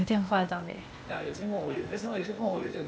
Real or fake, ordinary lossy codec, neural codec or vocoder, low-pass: fake; none; codec, 16 kHz, 0.8 kbps, ZipCodec; none